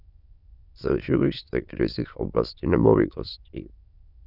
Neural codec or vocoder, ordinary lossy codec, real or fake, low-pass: autoencoder, 22.05 kHz, a latent of 192 numbers a frame, VITS, trained on many speakers; Opus, 64 kbps; fake; 5.4 kHz